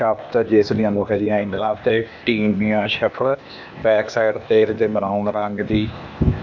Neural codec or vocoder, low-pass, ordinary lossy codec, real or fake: codec, 16 kHz, 0.8 kbps, ZipCodec; 7.2 kHz; none; fake